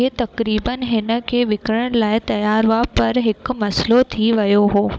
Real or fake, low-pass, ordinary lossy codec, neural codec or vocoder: fake; none; none; codec, 16 kHz, 16 kbps, FunCodec, trained on Chinese and English, 50 frames a second